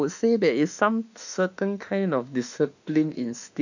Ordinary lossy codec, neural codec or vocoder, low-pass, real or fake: none; autoencoder, 48 kHz, 32 numbers a frame, DAC-VAE, trained on Japanese speech; 7.2 kHz; fake